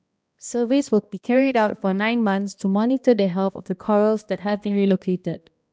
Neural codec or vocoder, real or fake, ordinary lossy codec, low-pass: codec, 16 kHz, 1 kbps, X-Codec, HuBERT features, trained on balanced general audio; fake; none; none